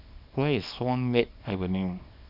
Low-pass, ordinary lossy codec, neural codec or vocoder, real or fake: 5.4 kHz; none; codec, 24 kHz, 0.9 kbps, WavTokenizer, small release; fake